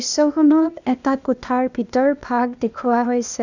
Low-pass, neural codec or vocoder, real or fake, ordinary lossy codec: 7.2 kHz; codec, 16 kHz, 0.8 kbps, ZipCodec; fake; none